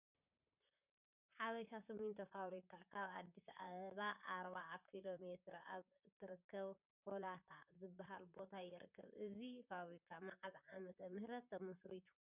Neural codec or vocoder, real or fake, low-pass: vocoder, 44.1 kHz, 128 mel bands, Pupu-Vocoder; fake; 3.6 kHz